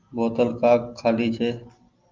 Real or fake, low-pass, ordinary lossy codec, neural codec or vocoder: real; 7.2 kHz; Opus, 32 kbps; none